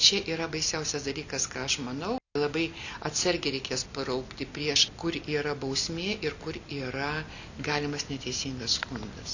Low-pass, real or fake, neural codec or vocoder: 7.2 kHz; real; none